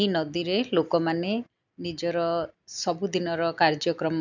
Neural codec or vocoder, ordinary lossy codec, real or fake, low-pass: none; none; real; 7.2 kHz